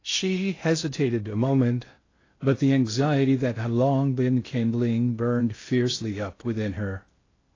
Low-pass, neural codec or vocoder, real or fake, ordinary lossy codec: 7.2 kHz; codec, 16 kHz in and 24 kHz out, 0.6 kbps, FocalCodec, streaming, 2048 codes; fake; AAC, 32 kbps